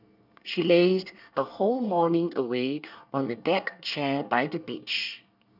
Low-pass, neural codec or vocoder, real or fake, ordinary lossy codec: 5.4 kHz; codec, 24 kHz, 1 kbps, SNAC; fake; none